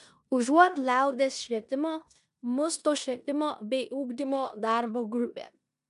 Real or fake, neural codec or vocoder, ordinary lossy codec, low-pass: fake; codec, 16 kHz in and 24 kHz out, 0.9 kbps, LongCat-Audio-Codec, four codebook decoder; MP3, 96 kbps; 10.8 kHz